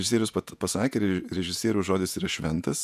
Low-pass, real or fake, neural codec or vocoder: 14.4 kHz; fake; vocoder, 48 kHz, 128 mel bands, Vocos